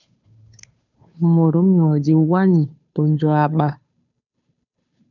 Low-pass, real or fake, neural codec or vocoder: 7.2 kHz; fake; codec, 16 kHz, 2 kbps, FunCodec, trained on Chinese and English, 25 frames a second